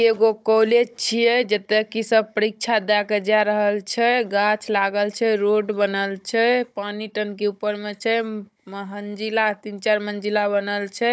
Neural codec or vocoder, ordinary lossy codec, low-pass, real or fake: codec, 16 kHz, 16 kbps, FunCodec, trained on Chinese and English, 50 frames a second; none; none; fake